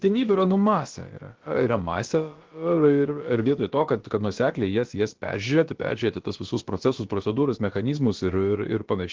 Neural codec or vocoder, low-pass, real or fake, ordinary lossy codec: codec, 16 kHz, about 1 kbps, DyCAST, with the encoder's durations; 7.2 kHz; fake; Opus, 16 kbps